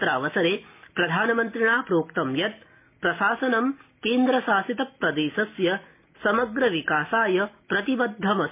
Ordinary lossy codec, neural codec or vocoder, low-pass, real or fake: MP3, 24 kbps; none; 3.6 kHz; real